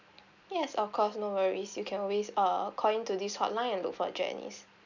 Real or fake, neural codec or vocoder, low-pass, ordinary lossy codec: real; none; 7.2 kHz; none